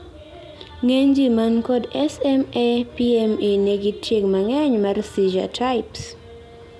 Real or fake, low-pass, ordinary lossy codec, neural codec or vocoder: real; none; none; none